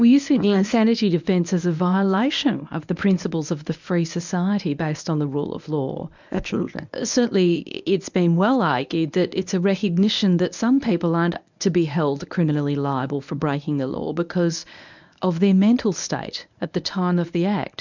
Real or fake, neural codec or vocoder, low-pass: fake; codec, 24 kHz, 0.9 kbps, WavTokenizer, medium speech release version 1; 7.2 kHz